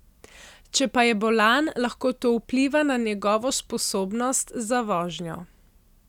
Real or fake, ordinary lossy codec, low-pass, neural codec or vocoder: real; none; 19.8 kHz; none